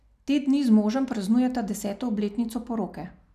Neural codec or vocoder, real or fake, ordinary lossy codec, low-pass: none; real; none; 14.4 kHz